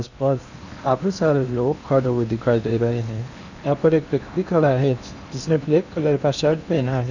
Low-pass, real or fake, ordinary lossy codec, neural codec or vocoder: 7.2 kHz; fake; none; codec, 16 kHz in and 24 kHz out, 0.8 kbps, FocalCodec, streaming, 65536 codes